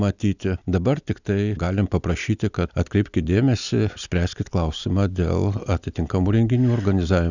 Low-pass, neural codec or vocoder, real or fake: 7.2 kHz; none; real